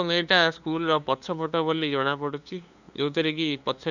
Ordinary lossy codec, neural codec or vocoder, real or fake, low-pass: none; codec, 16 kHz, 2 kbps, FunCodec, trained on LibriTTS, 25 frames a second; fake; 7.2 kHz